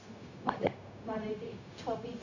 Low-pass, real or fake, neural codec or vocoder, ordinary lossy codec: 7.2 kHz; fake; codec, 16 kHz, 0.4 kbps, LongCat-Audio-Codec; none